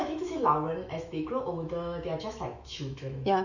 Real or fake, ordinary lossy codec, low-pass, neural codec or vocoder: fake; none; 7.2 kHz; autoencoder, 48 kHz, 128 numbers a frame, DAC-VAE, trained on Japanese speech